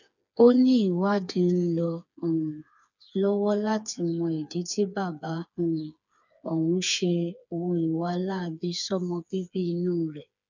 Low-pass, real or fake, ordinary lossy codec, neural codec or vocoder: 7.2 kHz; fake; none; codec, 16 kHz, 4 kbps, FreqCodec, smaller model